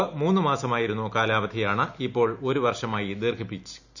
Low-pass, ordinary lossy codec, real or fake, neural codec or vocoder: 7.2 kHz; none; real; none